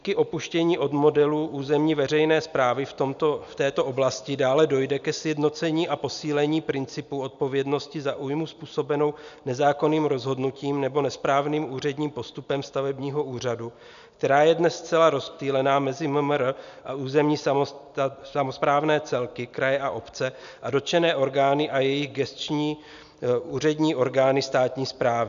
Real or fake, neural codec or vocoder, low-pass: real; none; 7.2 kHz